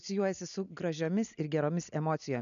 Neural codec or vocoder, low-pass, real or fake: none; 7.2 kHz; real